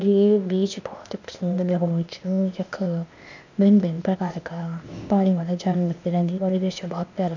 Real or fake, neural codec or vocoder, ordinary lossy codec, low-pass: fake; codec, 16 kHz, 0.8 kbps, ZipCodec; none; 7.2 kHz